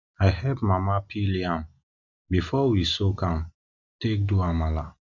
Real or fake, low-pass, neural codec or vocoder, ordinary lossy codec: real; 7.2 kHz; none; none